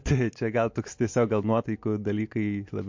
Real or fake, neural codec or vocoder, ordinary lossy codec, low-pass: real; none; MP3, 48 kbps; 7.2 kHz